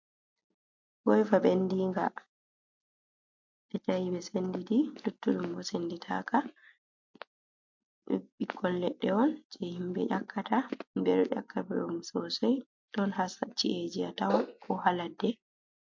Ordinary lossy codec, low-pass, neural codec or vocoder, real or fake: MP3, 48 kbps; 7.2 kHz; none; real